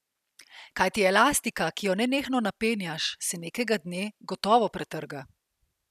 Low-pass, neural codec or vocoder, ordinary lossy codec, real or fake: 14.4 kHz; none; none; real